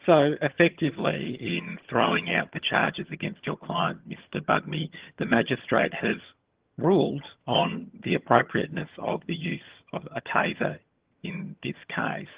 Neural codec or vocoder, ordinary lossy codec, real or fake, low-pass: vocoder, 22.05 kHz, 80 mel bands, HiFi-GAN; Opus, 16 kbps; fake; 3.6 kHz